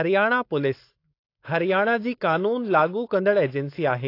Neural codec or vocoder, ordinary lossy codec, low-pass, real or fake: codec, 16 kHz, 4.8 kbps, FACodec; AAC, 32 kbps; 5.4 kHz; fake